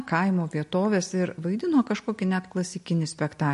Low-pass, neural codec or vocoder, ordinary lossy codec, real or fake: 14.4 kHz; none; MP3, 48 kbps; real